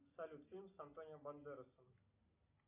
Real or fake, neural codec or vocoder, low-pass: real; none; 3.6 kHz